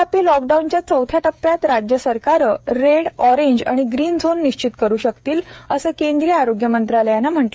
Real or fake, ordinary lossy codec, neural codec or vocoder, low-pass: fake; none; codec, 16 kHz, 8 kbps, FreqCodec, smaller model; none